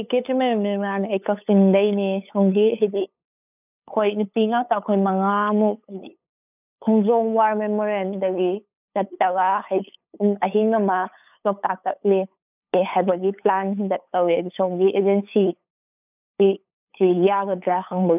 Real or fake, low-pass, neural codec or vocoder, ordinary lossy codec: fake; 3.6 kHz; codec, 16 kHz, 8 kbps, FunCodec, trained on LibriTTS, 25 frames a second; none